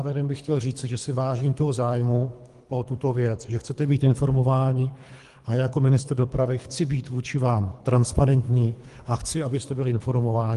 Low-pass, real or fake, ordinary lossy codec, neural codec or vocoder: 10.8 kHz; fake; Opus, 24 kbps; codec, 24 kHz, 3 kbps, HILCodec